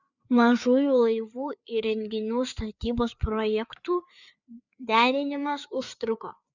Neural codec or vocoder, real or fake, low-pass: codec, 16 kHz, 4 kbps, FreqCodec, larger model; fake; 7.2 kHz